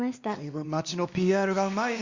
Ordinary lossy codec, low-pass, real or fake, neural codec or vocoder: Opus, 64 kbps; 7.2 kHz; fake; codec, 16 kHz, 2 kbps, X-Codec, WavLM features, trained on Multilingual LibriSpeech